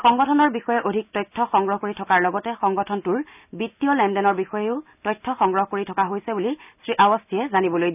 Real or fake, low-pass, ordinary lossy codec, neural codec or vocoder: real; 3.6 kHz; none; none